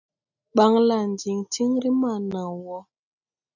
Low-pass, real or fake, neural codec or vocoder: 7.2 kHz; real; none